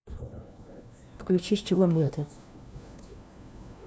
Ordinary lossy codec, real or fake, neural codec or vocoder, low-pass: none; fake; codec, 16 kHz, 1 kbps, FunCodec, trained on LibriTTS, 50 frames a second; none